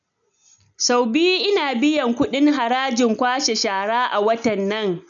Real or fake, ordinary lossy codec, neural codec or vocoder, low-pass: real; none; none; 7.2 kHz